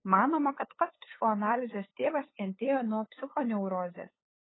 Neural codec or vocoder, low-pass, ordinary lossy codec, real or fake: codec, 16 kHz, 8 kbps, FunCodec, trained on LibriTTS, 25 frames a second; 7.2 kHz; AAC, 16 kbps; fake